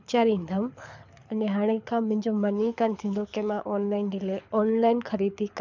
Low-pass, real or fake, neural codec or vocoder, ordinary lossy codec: 7.2 kHz; fake; codec, 24 kHz, 6 kbps, HILCodec; none